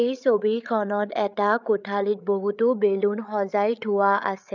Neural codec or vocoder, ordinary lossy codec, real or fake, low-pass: codec, 16 kHz, 8 kbps, FreqCodec, larger model; none; fake; 7.2 kHz